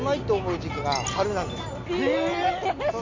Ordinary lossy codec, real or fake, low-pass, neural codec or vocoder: MP3, 64 kbps; real; 7.2 kHz; none